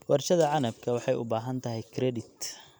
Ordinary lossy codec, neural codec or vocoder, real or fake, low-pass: none; none; real; none